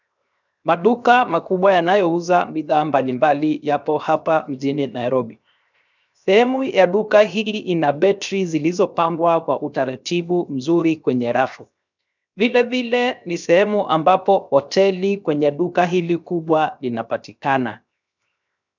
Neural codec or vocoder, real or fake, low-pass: codec, 16 kHz, 0.7 kbps, FocalCodec; fake; 7.2 kHz